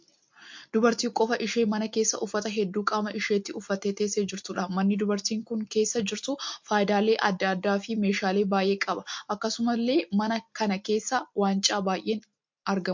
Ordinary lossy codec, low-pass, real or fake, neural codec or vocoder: MP3, 48 kbps; 7.2 kHz; real; none